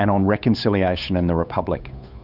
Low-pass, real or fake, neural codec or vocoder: 5.4 kHz; fake; codec, 16 kHz, 8 kbps, FunCodec, trained on Chinese and English, 25 frames a second